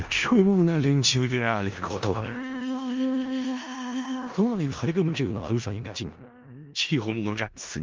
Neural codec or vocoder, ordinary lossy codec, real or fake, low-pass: codec, 16 kHz in and 24 kHz out, 0.4 kbps, LongCat-Audio-Codec, four codebook decoder; Opus, 32 kbps; fake; 7.2 kHz